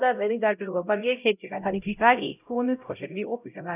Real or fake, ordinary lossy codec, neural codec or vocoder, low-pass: fake; none; codec, 16 kHz, 0.5 kbps, X-Codec, HuBERT features, trained on LibriSpeech; 3.6 kHz